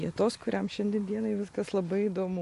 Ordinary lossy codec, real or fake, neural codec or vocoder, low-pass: MP3, 48 kbps; real; none; 14.4 kHz